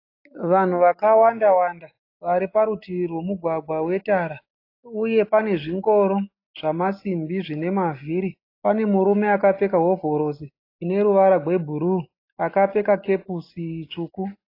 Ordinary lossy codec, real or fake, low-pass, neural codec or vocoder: AAC, 32 kbps; real; 5.4 kHz; none